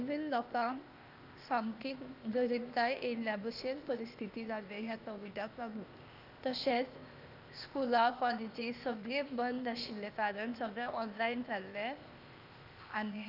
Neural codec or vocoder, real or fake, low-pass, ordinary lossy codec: codec, 16 kHz, 0.8 kbps, ZipCodec; fake; 5.4 kHz; none